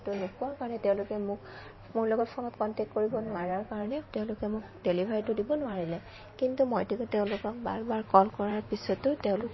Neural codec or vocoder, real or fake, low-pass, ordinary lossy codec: vocoder, 44.1 kHz, 128 mel bands every 512 samples, BigVGAN v2; fake; 7.2 kHz; MP3, 24 kbps